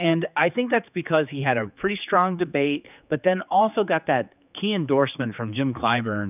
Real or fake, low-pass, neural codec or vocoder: fake; 3.6 kHz; codec, 16 kHz, 4 kbps, X-Codec, HuBERT features, trained on general audio